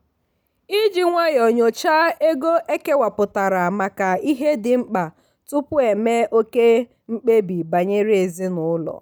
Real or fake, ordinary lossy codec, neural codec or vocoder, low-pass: real; none; none; none